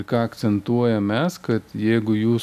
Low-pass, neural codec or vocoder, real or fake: 14.4 kHz; none; real